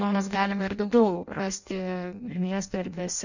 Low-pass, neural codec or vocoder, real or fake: 7.2 kHz; codec, 16 kHz in and 24 kHz out, 0.6 kbps, FireRedTTS-2 codec; fake